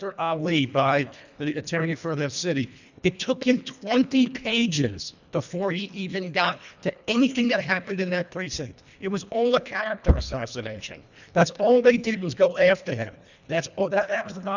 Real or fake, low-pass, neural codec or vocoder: fake; 7.2 kHz; codec, 24 kHz, 1.5 kbps, HILCodec